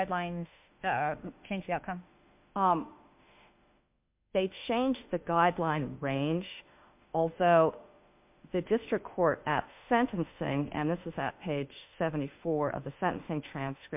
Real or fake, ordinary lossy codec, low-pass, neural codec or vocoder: fake; MP3, 32 kbps; 3.6 kHz; autoencoder, 48 kHz, 32 numbers a frame, DAC-VAE, trained on Japanese speech